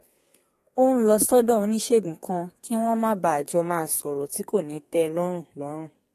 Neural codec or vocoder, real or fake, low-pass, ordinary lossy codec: codec, 32 kHz, 1.9 kbps, SNAC; fake; 14.4 kHz; AAC, 48 kbps